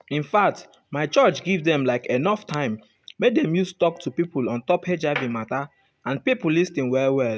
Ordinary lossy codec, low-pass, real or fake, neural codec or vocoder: none; none; real; none